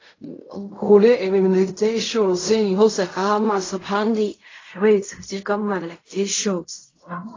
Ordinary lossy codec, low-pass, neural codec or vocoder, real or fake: AAC, 32 kbps; 7.2 kHz; codec, 16 kHz in and 24 kHz out, 0.4 kbps, LongCat-Audio-Codec, fine tuned four codebook decoder; fake